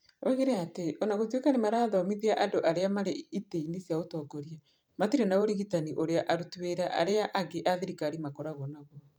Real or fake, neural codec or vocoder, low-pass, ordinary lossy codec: fake; vocoder, 44.1 kHz, 128 mel bands every 512 samples, BigVGAN v2; none; none